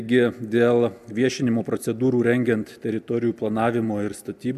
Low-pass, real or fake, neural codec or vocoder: 14.4 kHz; real; none